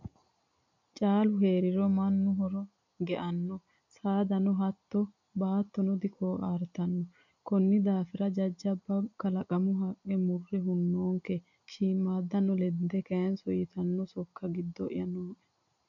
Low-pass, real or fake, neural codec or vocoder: 7.2 kHz; real; none